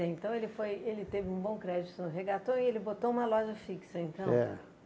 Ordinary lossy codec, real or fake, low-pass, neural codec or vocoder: none; real; none; none